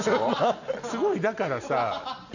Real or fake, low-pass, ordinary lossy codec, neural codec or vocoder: real; 7.2 kHz; none; none